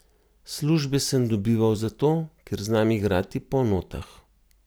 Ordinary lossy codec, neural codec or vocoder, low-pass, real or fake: none; none; none; real